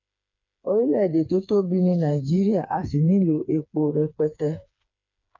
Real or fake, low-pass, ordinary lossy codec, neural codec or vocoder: fake; 7.2 kHz; none; codec, 16 kHz, 4 kbps, FreqCodec, smaller model